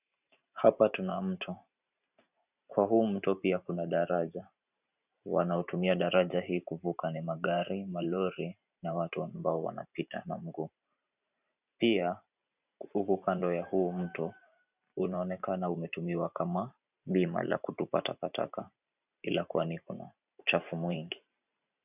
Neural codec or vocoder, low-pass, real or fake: vocoder, 44.1 kHz, 128 mel bands every 512 samples, BigVGAN v2; 3.6 kHz; fake